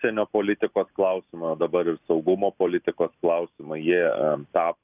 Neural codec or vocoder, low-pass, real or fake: none; 3.6 kHz; real